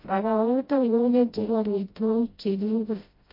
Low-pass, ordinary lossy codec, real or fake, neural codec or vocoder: 5.4 kHz; MP3, 32 kbps; fake; codec, 16 kHz, 0.5 kbps, FreqCodec, smaller model